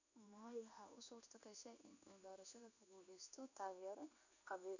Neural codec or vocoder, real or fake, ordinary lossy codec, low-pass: codec, 16 kHz in and 24 kHz out, 1 kbps, XY-Tokenizer; fake; none; 7.2 kHz